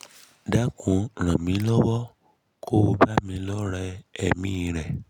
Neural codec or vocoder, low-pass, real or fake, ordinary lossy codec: none; 19.8 kHz; real; none